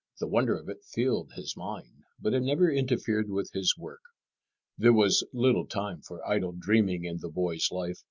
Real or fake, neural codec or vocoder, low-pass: real; none; 7.2 kHz